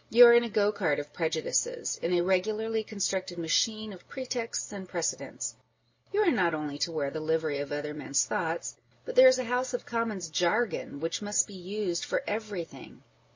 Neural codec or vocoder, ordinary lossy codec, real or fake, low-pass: none; MP3, 32 kbps; real; 7.2 kHz